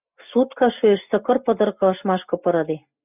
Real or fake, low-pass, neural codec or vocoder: real; 3.6 kHz; none